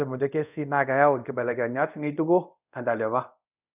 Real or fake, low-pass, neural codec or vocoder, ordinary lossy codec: fake; 3.6 kHz; codec, 24 kHz, 0.5 kbps, DualCodec; none